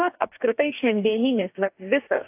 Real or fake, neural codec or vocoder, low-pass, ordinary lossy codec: fake; codec, 44.1 kHz, 2.6 kbps, DAC; 3.6 kHz; AAC, 24 kbps